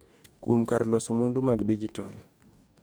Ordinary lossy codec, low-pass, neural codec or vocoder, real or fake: none; none; codec, 44.1 kHz, 2.6 kbps, DAC; fake